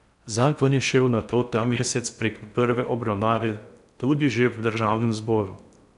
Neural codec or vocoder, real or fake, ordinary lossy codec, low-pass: codec, 16 kHz in and 24 kHz out, 0.6 kbps, FocalCodec, streaming, 4096 codes; fake; none; 10.8 kHz